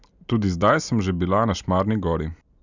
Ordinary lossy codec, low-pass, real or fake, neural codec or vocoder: none; 7.2 kHz; real; none